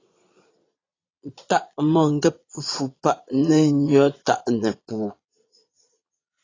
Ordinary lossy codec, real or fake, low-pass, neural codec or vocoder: AAC, 32 kbps; fake; 7.2 kHz; vocoder, 22.05 kHz, 80 mel bands, Vocos